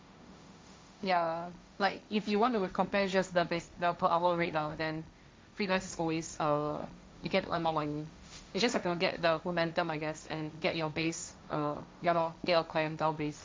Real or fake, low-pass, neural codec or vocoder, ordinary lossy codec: fake; none; codec, 16 kHz, 1.1 kbps, Voila-Tokenizer; none